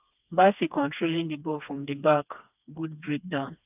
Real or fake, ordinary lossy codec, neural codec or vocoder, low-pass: fake; none; codec, 16 kHz, 2 kbps, FreqCodec, smaller model; 3.6 kHz